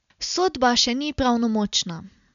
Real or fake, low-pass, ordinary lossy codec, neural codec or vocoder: real; 7.2 kHz; none; none